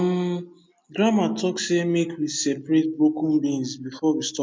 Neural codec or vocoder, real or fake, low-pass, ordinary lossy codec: none; real; none; none